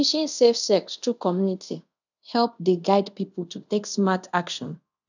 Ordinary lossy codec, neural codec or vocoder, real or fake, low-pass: none; codec, 24 kHz, 0.5 kbps, DualCodec; fake; 7.2 kHz